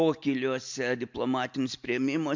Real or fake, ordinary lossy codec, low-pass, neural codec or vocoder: real; MP3, 64 kbps; 7.2 kHz; none